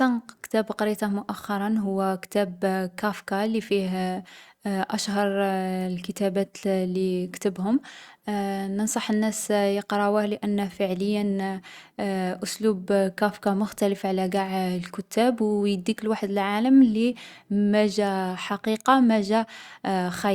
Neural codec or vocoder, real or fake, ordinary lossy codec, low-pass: none; real; Opus, 64 kbps; 19.8 kHz